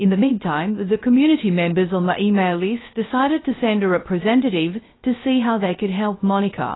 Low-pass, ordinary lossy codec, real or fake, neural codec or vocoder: 7.2 kHz; AAC, 16 kbps; fake; codec, 16 kHz, 0.3 kbps, FocalCodec